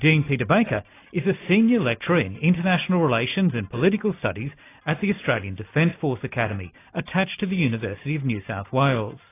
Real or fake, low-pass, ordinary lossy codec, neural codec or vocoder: real; 3.6 kHz; AAC, 24 kbps; none